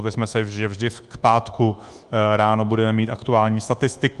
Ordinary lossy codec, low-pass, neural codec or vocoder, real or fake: Opus, 24 kbps; 10.8 kHz; codec, 24 kHz, 1.2 kbps, DualCodec; fake